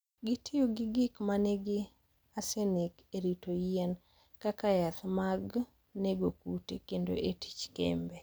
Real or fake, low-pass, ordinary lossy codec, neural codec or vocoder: real; none; none; none